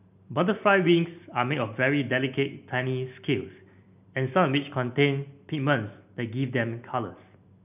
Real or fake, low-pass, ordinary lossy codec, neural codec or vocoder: real; 3.6 kHz; none; none